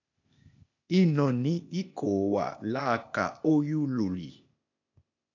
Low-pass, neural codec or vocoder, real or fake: 7.2 kHz; codec, 16 kHz, 0.8 kbps, ZipCodec; fake